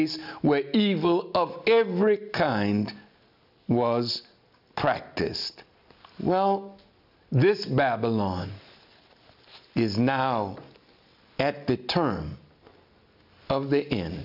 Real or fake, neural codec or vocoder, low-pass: real; none; 5.4 kHz